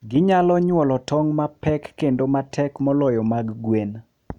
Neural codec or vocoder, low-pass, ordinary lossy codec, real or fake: none; 19.8 kHz; none; real